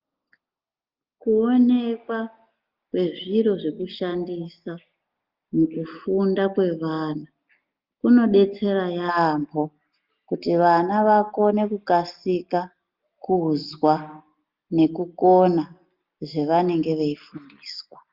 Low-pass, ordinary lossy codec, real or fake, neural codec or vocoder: 5.4 kHz; Opus, 24 kbps; real; none